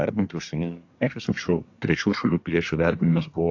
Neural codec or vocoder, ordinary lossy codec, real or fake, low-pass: codec, 24 kHz, 1 kbps, SNAC; AAC, 48 kbps; fake; 7.2 kHz